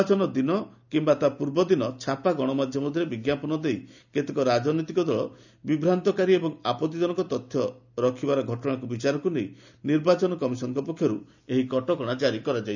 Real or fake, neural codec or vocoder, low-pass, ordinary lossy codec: real; none; 7.2 kHz; none